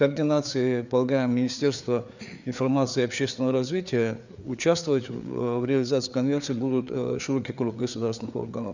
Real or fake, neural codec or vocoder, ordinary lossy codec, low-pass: fake; codec, 16 kHz, 4 kbps, FreqCodec, larger model; none; 7.2 kHz